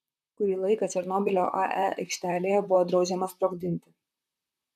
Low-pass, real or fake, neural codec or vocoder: 14.4 kHz; fake; vocoder, 44.1 kHz, 128 mel bands, Pupu-Vocoder